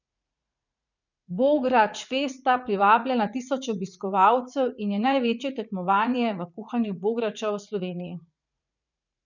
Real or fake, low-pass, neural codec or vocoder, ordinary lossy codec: fake; 7.2 kHz; vocoder, 44.1 kHz, 80 mel bands, Vocos; none